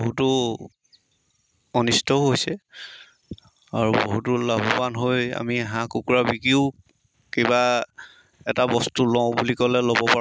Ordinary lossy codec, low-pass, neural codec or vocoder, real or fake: none; none; none; real